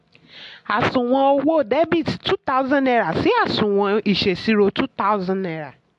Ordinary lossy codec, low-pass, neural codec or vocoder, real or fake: AAC, 64 kbps; 10.8 kHz; none; real